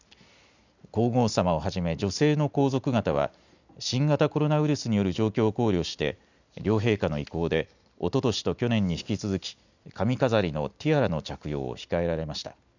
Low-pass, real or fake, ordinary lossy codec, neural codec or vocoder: 7.2 kHz; real; none; none